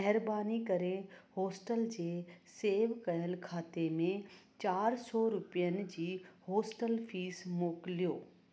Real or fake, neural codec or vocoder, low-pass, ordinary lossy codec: real; none; none; none